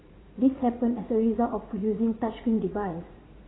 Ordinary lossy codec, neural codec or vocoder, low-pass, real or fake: AAC, 16 kbps; vocoder, 44.1 kHz, 80 mel bands, Vocos; 7.2 kHz; fake